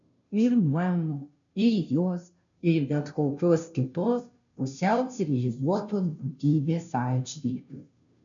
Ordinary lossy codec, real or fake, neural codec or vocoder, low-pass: MP3, 96 kbps; fake; codec, 16 kHz, 0.5 kbps, FunCodec, trained on Chinese and English, 25 frames a second; 7.2 kHz